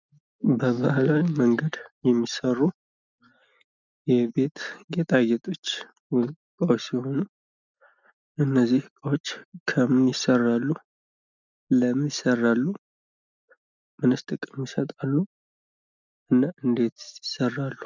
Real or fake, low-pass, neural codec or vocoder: real; 7.2 kHz; none